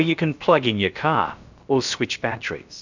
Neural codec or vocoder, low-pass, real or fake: codec, 16 kHz, about 1 kbps, DyCAST, with the encoder's durations; 7.2 kHz; fake